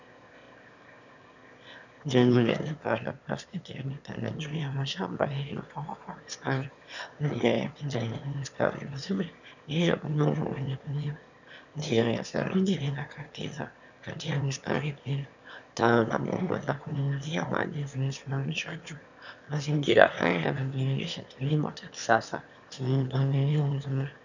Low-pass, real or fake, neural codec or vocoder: 7.2 kHz; fake; autoencoder, 22.05 kHz, a latent of 192 numbers a frame, VITS, trained on one speaker